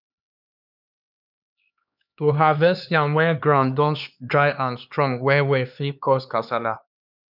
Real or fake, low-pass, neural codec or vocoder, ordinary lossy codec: fake; 5.4 kHz; codec, 16 kHz, 2 kbps, X-Codec, HuBERT features, trained on LibriSpeech; none